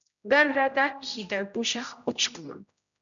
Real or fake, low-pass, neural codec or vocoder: fake; 7.2 kHz; codec, 16 kHz, 0.5 kbps, X-Codec, HuBERT features, trained on general audio